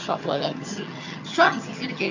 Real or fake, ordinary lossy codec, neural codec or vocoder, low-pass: fake; none; vocoder, 22.05 kHz, 80 mel bands, HiFi-GAN; 7.2 kHz